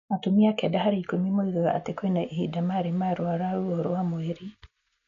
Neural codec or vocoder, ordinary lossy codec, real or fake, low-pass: none; none; real; 7.2 kHz